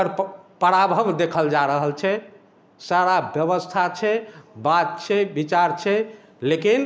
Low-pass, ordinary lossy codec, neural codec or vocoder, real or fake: none; none; none; real